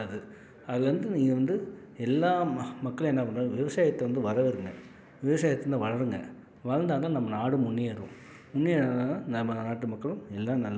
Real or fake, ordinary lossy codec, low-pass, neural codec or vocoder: real; none; none; none